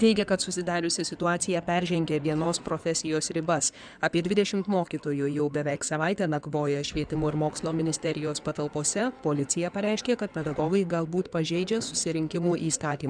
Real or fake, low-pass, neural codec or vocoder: fake; 9.9 kHz; codec, 16 kHz in and 24 kHz out, 2.2 kbps, FireRedTTS-2 codec